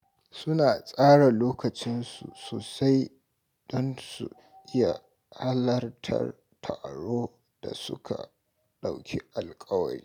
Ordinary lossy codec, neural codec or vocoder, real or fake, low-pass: none; none; real; 19.8 kHz